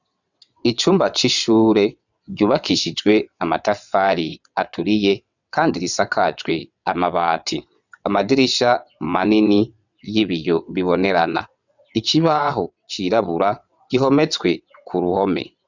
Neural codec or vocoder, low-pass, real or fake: vocoder, 22.05 kHz, 80 mel bands, WaveNeXt; 7.2 kHz; fake